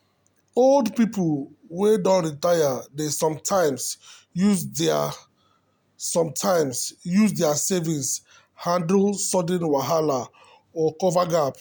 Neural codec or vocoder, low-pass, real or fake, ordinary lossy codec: none; none; real; none